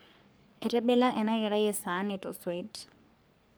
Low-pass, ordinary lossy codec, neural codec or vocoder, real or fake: none; none; codec, 44.1 kHz, 3.4 kbps, Pupu-Codec; fake